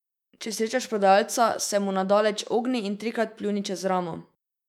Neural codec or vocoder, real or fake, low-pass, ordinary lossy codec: autoencoder, 48 kHz, 128 numbers a frame, DAC-VAE, trained on Japanese speech; fake; 19.8 kHz; none